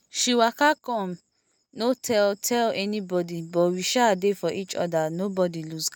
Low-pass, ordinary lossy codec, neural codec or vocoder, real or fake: none; none; none; real